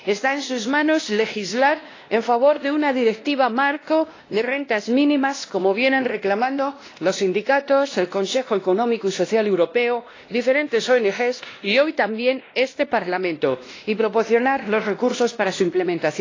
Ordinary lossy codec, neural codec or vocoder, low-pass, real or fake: AAC, 32 kbps; codec, 16 kHz, 1 kbps, X-Codec, WavLM features, trained on Multilingual LibriSpeech; 7.2 kHz; fake